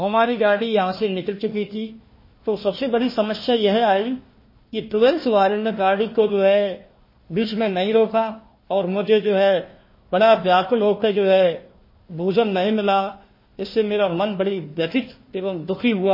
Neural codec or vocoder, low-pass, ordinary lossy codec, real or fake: codec, 16 kHz, 1 kbps, FunCodec, trained on Chinese and English, 50 frames a second; 5.4 kHz; MP3, 24 kbps; fake